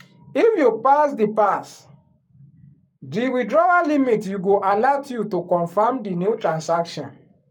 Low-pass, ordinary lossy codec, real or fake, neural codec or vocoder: 19.8 kHz; none; fake; codec, 44.1 kHz, 7.8 kbps, Pupu-Codec